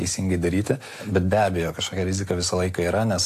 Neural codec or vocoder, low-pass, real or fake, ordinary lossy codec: none; 14.4 kHz; real; AAC, 64 kbps